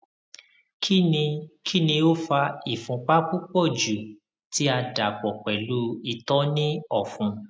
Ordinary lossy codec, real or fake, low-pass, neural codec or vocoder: none; real; none; none